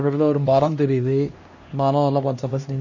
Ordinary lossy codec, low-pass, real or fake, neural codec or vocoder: MP3, 32 kbps; 7.2 kHz; fake; codec, 16 kHz, 1 kbps, X-Codec, HuBERT features, trained on LibriSpeech